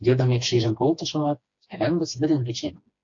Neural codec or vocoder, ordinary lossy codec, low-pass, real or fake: codec, 16 kHz, 2 kbps, FreqCodec, smaller model; AAC, 48 kbps; 7.2 kHz; fake